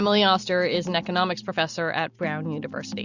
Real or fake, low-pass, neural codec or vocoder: real; 7.2 kHz; none